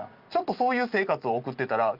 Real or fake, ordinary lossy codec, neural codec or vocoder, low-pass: real; Opus, 24 kbps; none; 5.4 kHz